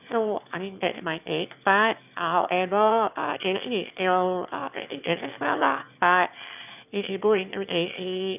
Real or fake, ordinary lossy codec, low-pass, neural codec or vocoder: fake; none; 3.6 kHz; autoencoder, 22.05 kHz, a latent of 192 numbers a frame, VITS, trained on one speaker